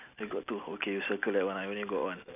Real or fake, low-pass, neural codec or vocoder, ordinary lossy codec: real; 3.6 kHz; none; none